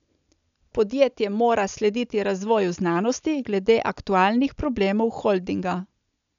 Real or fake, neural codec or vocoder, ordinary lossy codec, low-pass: real; none; none; 7.2 kHz